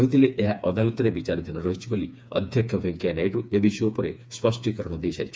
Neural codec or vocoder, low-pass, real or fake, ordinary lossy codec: codec, 16 kHz, 4 kbps, FreqCodec, smaller model; none; fake; none